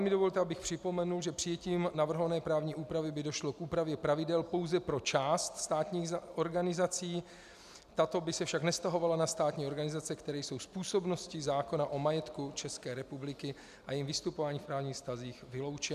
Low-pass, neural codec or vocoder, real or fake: 14.4 kHz; none; real